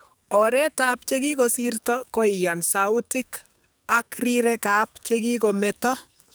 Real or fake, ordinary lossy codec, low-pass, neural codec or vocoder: fake; none; none; codec, 44.1 kHz, 2.6 kbps, SNAC